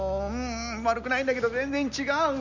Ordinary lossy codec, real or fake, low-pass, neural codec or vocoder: none; real; 7.2 kHz; none